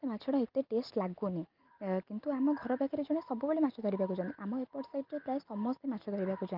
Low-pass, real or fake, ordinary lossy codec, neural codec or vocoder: 5.4 kHz; real; Opus, 32 kbps; none